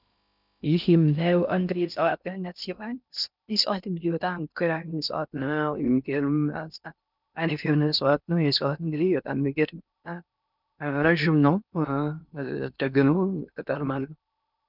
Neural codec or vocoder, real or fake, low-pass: codec, 16 kHz in and 24 kHz out, 0.6 kbps, FocalCodec, streaming, 2048 codes; fake; 5.4 kHz